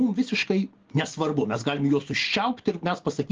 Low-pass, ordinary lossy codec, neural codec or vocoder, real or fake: 7.2 kHz; Opus, 16 kbps; none; real